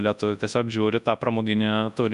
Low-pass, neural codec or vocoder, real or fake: 10.8 kHz; codec, 24 kHz, 0.9 kbps, WavTokenizer, large speech release; fake